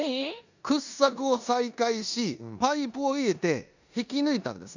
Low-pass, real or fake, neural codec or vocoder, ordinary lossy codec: 7.2 kHz; fake; codec, 16 kHz in and 24 kHz out, 0.9 kbps, LongCat-Audio-Codec, four codebook decoder; none